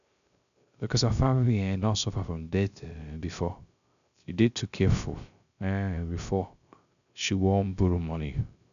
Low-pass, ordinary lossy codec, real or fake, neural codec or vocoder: 7.2 kHz; none; fake; codec, 16 kHz, 0.3 kbps, FocalCodec